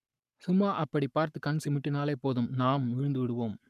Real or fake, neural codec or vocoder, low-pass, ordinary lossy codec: fake; codec, 44.1 kHz, 7.8 kbps, Pupu-Codec; 14.4 kHz; none